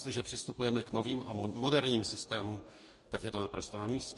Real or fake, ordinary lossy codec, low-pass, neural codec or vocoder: fake; MP3, 48 kbps; 14.4 kHz; codec, 44.1 kHz, 2.6 kbps, DAC